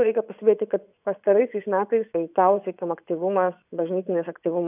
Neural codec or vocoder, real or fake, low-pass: vocoder, 24 kHz, 100 mel bands, Vocos; fake; 3.6 kHz